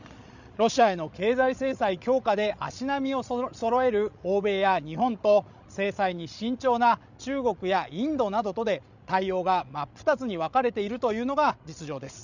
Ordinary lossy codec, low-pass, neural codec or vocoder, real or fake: none; 7.2 kHz; codec, 16 kHz, 16 kbps, FreqCodec, larger model; fake